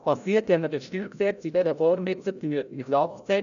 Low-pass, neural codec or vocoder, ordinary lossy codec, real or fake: 7.2 kHz; codec, 16 kHz, 0.5 kbps, FreqCodec, larger model; MP3, 96 kbps; fake